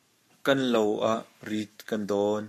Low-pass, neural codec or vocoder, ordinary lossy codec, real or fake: 14.4 kHz; codec, 44.1 kHz, 7.8 kbps, Pupu-Codec; MP3, 64 kbps; fake